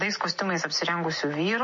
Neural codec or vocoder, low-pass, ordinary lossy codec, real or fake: none; 7.2 kHz; MP3, 32 kbps; real